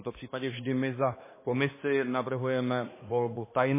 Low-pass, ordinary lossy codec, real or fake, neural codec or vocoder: 3.6 kHz; MP3, 16 kbps; fake; codec, 16 kHz, 2 kbps, X-Codec, HuBERT features, trained on balanced general audio